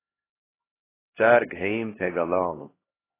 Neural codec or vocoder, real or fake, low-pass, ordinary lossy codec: codec, 16 kHz, 1 kbps, X-Codec, HuBERT features, trained on LibriSpeech; fake; 3.6 kHz; AAC, 16 kbps